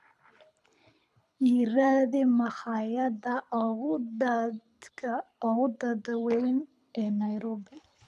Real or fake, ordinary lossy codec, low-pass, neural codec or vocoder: fake; none; none; codec, 24 kHz, 6 kbps, HILCodec